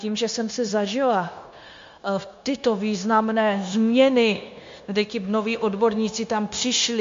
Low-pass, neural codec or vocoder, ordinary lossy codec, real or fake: 7.2 kHz; codec, 16 kHz, 0.9 kbps, LongCat-Audio-Codec; MP3, 48 kbps; fake